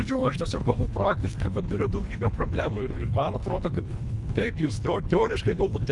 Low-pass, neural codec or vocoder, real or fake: 10.8 kHz; codec, 24 kHz, 1.5 kbps, HILCodec; fake